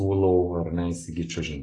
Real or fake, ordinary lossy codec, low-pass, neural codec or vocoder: real; AAC, 32 kbps; 10.8 kHz; none